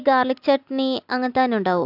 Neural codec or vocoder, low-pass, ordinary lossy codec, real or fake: none; 5.4 kHz; AAC, 48 kbps; real